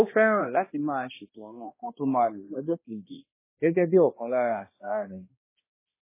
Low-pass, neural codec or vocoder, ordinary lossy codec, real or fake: 3.6 kHz; codec, 16 kHz, 1 kbps, X-Codec, HuBERT features, trained on balanced general audio; MP3, 16 kbps; fake